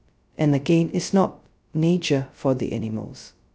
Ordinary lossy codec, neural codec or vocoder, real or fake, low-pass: none; codec, 16 kHz, 0.2 kbps, FocalCodec; fake; none